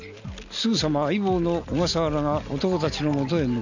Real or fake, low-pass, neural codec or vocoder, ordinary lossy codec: real; 7.2 kHz; none; none